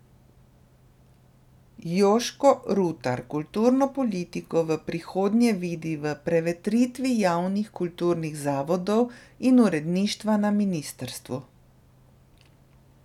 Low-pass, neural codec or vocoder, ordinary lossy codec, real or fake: 19.8 kHz; none; none; real